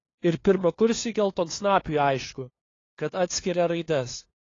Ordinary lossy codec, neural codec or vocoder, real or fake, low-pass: AAC, 32 kbps; codec, 16 kHz, 2 kbps, FunCodec, trained on LibriTTS, 25 frames a second; fake; 7.2 kHz